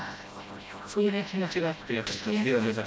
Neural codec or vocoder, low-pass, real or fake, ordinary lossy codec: codec, 16 kHz, 0.5 kbps, FreqCodec, smaller model; none; fake; none